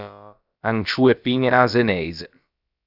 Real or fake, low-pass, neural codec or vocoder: fake; 5.4 kHz; codec, 16 kHz, about 1 kbps, DyCAST, with the encoder's durations